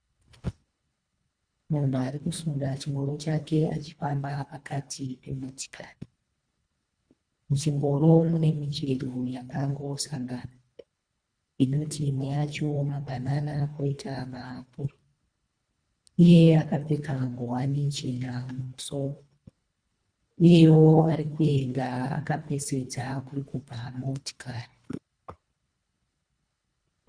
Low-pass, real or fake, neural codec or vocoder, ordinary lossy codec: 9.9 kHz; fake; codec, 24 kHz, 1.5 kbps, HILCodec; Opus, 64 kbps